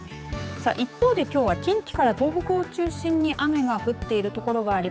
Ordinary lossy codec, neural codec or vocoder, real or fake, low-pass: none; codec, 16 kHz, 4 kbps, X-Codec, HuBERT features, trained on general audio; fake; none